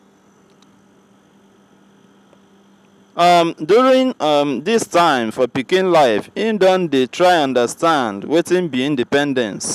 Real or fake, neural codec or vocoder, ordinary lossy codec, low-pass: real; none; none; 14.4 kHz